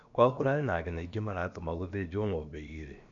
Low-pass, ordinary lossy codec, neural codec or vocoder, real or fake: 7.2 kHz; MP3, 64 kbps; codec, 16 kHz, about 1 kbps, DyCAST, with the encoder's durations; fake